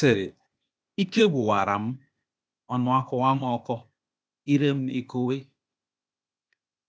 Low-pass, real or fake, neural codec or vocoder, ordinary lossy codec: none; fake; codec, 16 kHz, 0.8 kbps, ZipCodec; none